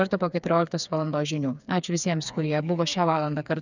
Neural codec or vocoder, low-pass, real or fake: codec, 16 kHz, 4 kbps, FreqCodec, smaller model; 7.2 kHz; fake